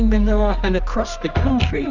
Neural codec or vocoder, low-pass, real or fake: codec, 24 kHz, 0.9 kbps, WavTokenizer, medium music audio release; 7.2 kHz; fake